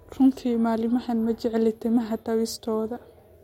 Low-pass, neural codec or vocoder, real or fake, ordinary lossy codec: 19.8 kHz; none; real; MP3, 64 kbps